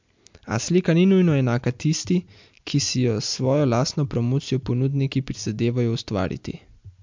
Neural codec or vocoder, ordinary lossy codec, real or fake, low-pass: none; MP3, 64 kbps; real; 7.2 kHz